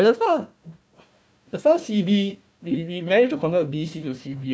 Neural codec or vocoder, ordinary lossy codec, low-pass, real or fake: codec, 16 kHz, 1 kbps, FunCodec, trained on Chinese and English, 50 frames a second; none; none; fake